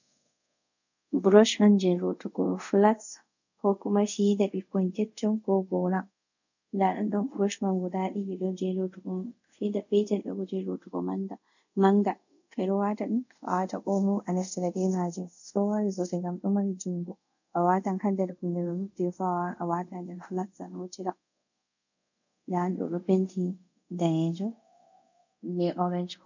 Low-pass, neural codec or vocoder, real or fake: 7.2 kHz; codec, 24 kHz, 0.5 kbps, DualCodec; fake